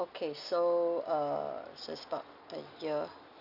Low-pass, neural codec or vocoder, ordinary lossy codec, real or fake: 5.4 kHz; none; none; real